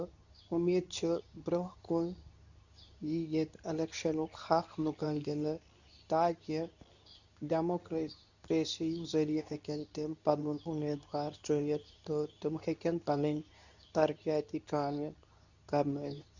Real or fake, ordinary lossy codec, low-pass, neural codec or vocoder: fake; none; 7.2 kHz; codec, 24 kHz, 0.9 kbps, WavTokenizer, medium speech release version 2